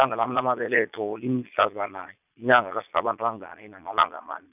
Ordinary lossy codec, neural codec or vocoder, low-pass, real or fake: none; vocoder, 22.05 kHz, 80 mel bands, Vocos; 3.6 kHz; fake